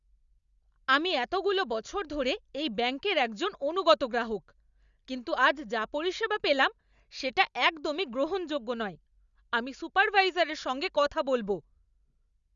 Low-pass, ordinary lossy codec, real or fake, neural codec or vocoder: 7.2 kHz; Opus, 64 kbps; real; none